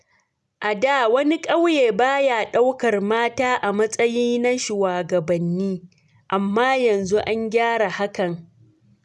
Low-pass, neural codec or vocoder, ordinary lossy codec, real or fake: none; none; none; real